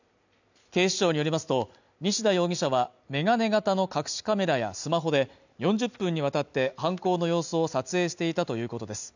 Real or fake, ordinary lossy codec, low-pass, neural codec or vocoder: real; none; 7.2 kHz; none